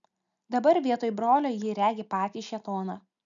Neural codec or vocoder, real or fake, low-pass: none; real; 7.2 kHz